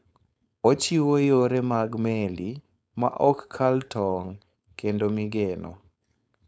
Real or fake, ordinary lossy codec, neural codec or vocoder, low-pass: fake; none; codec, 16 kHz, 4.8 kbps, FACodec; none